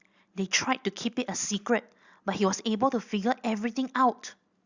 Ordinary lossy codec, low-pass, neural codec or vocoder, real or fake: Opus, 64 kbps; 7.2 kHz; none; real